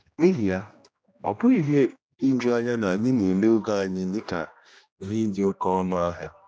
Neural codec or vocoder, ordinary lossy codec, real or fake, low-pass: codec, 16 kHz, 1 kbps, X-Codec, HuBERT features, trained on general audio; none; fake; none